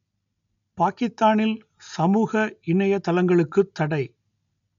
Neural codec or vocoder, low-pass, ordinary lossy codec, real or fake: none; 7.2 kHz; none; real